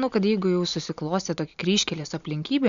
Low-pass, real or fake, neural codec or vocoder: 7.2 kHz; real; none